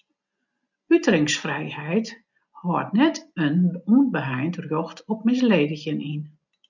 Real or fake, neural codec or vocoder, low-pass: real; none; 7.2 kHz